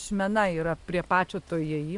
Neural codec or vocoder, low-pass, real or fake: none; 10.8 kHz; real